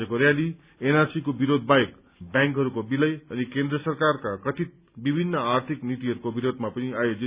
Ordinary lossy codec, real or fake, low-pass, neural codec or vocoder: Opus, 64 kbps; real; 3.6 kHz; none